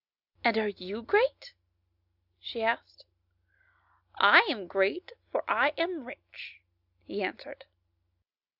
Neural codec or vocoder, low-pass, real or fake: none; 5.4 kHz; real